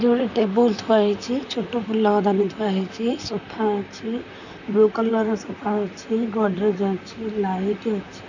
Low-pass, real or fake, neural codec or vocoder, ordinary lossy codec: 7.2 kHz; fake; vocoder, 44.1 kHz, 128 mel bands, Pupu-Vocoder; none